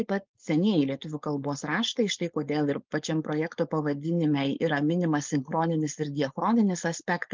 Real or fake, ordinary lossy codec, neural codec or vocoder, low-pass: fake; Opus, 32 kbps; codec, 16 kHz, 4.8 kbps, FACodec; 7.2 kHz